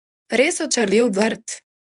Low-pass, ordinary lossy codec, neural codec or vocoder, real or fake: 10.8 kHz; none; codec, 24 kHz, 0.9 kbps, WavTokenizer, medium speech release version 1; fake